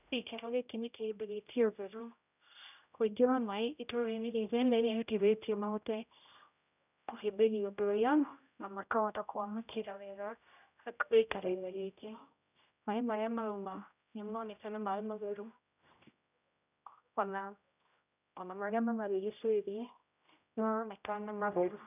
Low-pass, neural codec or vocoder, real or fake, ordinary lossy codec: 3.6 kHz; codec, 16 kHz, 0.5 kbps, X-Codec, HuBERT features, trained on general audio; fake; none